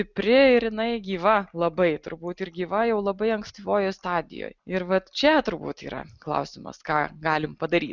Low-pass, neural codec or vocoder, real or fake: 7.2 kHz; none; real